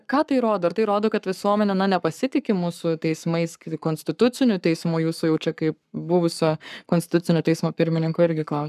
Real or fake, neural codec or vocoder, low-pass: fake; codec, 44.1 kHz, 7.8 kbps, Pupu-Codec; 14.4 kHz